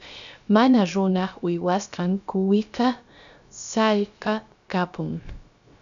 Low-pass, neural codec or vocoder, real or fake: 7.2 kHz; codec, 16 kHz, 0.3 kbps, FocalCodec; fake